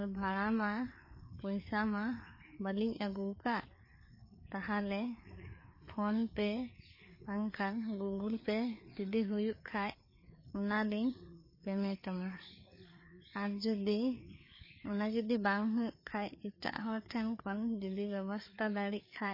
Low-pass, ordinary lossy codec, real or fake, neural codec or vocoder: 5.4 kHz; MP3, 24 kbps; fake; codec, 16 kHz, 4 kbps, FreqCodec, larger model